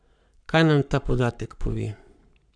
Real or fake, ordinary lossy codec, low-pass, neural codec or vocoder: fake; none; 9.9 kHz; codec, 44.1 kHz, 7.8 kbps, Pupu-Codec